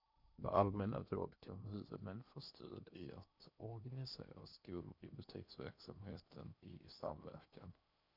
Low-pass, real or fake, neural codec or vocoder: 5.4 kHz; fake; codec, 16 kHz in and 24 kHz out, 0.8 kbps, FocalCodec, streaming, 65536 codes